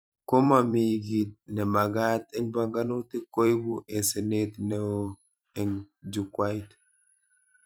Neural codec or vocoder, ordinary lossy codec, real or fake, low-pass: vocoder, 44.1 kHz, 128 mel bands every 512 samples, BigVGAN v2; none; fake; none